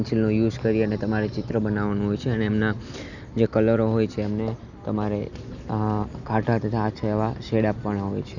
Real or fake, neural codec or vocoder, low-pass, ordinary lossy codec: real; none; 7.2 kHz; none